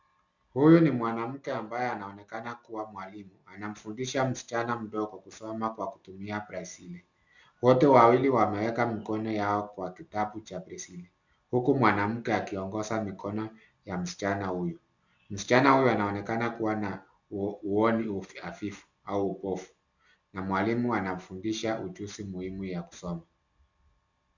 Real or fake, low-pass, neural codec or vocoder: real; 7.2 kHz; none